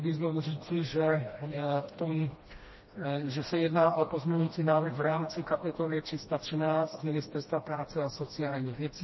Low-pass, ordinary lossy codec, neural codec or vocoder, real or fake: 7.2 kHz; MP3, 24 kbps; codec, 16 kHz, 1 kbps, FreqCodec, smaller model; fake